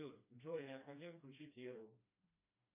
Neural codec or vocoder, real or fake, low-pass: codec, 16 kHz, 1 kbps, FreqCodec, smaller model; fake; 3.6 kHz